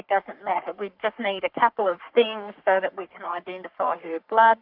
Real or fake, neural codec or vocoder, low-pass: fake; codec, 44.1 kHz, 3.4 kbps, Pupu-Codec; 5.4 kHz